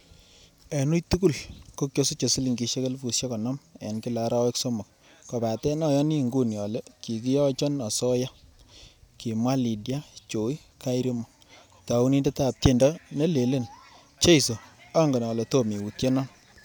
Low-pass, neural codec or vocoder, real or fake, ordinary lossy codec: none; none; real; none